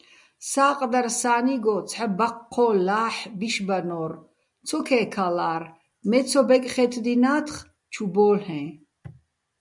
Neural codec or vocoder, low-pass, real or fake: none; 10.8 kHz; real